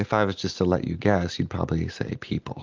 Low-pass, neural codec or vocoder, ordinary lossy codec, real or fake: 7.2 kHz; none; Opus, 32 kbps; real